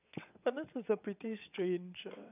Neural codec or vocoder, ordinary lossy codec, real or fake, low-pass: vocoder, 22.05 kHz, 80 mel bands, WaveNeXt; none; fake; 3.6 kHz